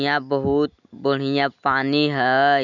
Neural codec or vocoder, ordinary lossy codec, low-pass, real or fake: none; none; 7.2 kHz; real